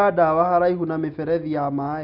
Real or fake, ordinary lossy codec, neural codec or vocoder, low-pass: real; none; none; 5.4 kHz